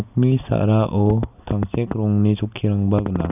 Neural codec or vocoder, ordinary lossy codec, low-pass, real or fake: none; none; 3.6 kHz; real